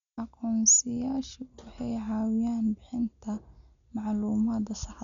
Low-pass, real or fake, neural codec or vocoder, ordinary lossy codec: 7.2 kHz; real; none; none